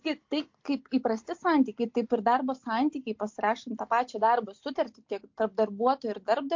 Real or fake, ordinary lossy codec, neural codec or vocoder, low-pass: real; MP3, 48 kbps; none; 7.2 kHz